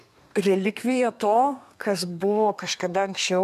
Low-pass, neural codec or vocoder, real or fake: 14.4 kHz; codec, 32 kHz, 1.9 kbps, SNAC; fake